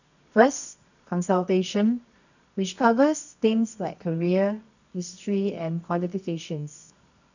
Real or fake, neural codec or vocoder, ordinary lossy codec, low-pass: fake; codec, 24 kHz, 0.9 kbps, WavTokenizer, medium music audio release; none; 7.2 kHz